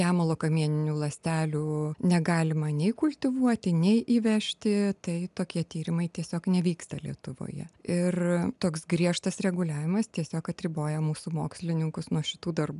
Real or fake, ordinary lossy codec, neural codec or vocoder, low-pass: real; MP3, 96 kbps; none; 10.8 kHz